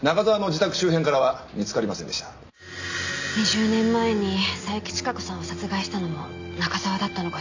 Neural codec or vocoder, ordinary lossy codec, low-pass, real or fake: none; AAC, 48 kbps; 7.2 kHz; real